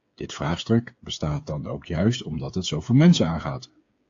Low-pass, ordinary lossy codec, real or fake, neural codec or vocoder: 7.2 kHz; AAC, 48 kbps; fake; codec, 16 kHz, 8 kbps, FreqCodec, smaller model